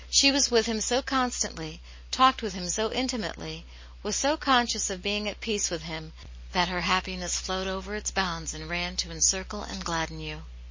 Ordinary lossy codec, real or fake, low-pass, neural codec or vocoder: MP3, 32 kbps; real; 7.2 kHz; none